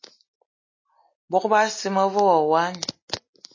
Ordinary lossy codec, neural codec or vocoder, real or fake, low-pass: MP3, 32 kbps; none; real; 7.2 kHz